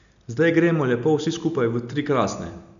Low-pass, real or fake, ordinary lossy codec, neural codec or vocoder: 7.2 kHz; real; none; none